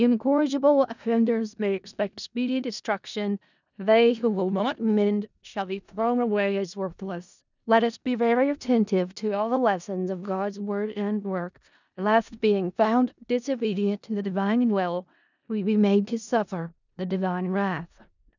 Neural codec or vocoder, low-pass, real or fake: codec, 16 kHz in and 24 kHz out, 0.4 kbps, LongCat-Audio-Codec, four codebook decoder; 7.2 kHz; fake